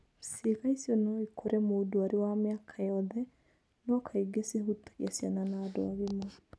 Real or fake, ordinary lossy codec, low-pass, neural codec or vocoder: real; none; none; none